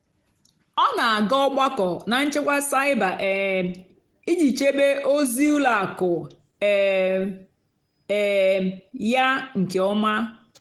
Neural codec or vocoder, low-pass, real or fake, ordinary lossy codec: none; 19.8 kHz; real; Opus, 16 kbps